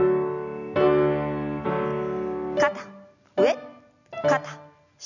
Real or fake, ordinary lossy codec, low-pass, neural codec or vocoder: real; none; 7.2 kHz; none